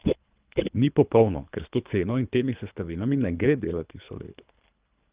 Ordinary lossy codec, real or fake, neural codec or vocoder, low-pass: Opus, 24 kbps; fake; codec, 24 kHz, 3 kbps, HILCodec; 3.6 kHz